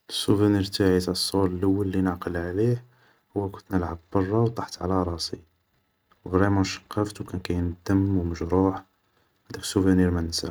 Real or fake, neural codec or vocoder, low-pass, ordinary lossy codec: real; none; none; none